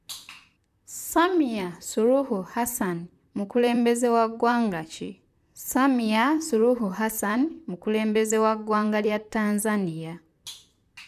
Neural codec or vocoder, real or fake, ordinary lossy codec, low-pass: vocoder, 44.1 kHz, 128 mel bands, Pupu-Vocoder; fake; none; 14.4 kHz